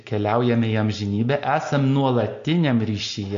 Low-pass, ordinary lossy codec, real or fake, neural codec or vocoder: 7.2 kHz; AAC, 64 kbps; real; none